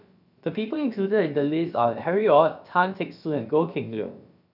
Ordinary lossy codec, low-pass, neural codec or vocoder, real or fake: none; 5.4 kHz; codec, 16 kHz, about 1 kbps, DyCAST, with the encoder's durations; fake